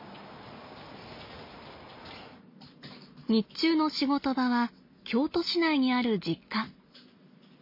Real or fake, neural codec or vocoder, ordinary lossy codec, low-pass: real; none; MP3, 32 kbps; 5.4 kHz